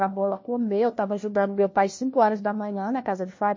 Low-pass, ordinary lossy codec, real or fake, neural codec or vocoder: 7.2 kHz; MP3, 32 kbps; fake; codec, 16 kHz, 1 kbps, FunCodec, trained on LibriTTS, 50 frames a second